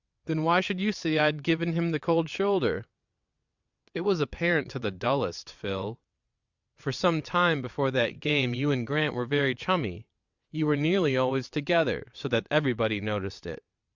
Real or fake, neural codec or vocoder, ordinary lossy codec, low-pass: fake; vocoder, 22.05 kHz, 80 mel bands, WaveNeXt; Opus, 64 kbps; 7.2 kHz